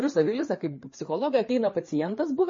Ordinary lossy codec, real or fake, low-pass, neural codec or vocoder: MP3, 32 kbps; fake; 7.2 kHz; codec, 16 kHz, 4 kbps, FreqCodec, larger model